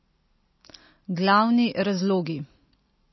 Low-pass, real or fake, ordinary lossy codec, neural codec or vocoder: 7.2 kHz; real; MP3, 24 kbps; none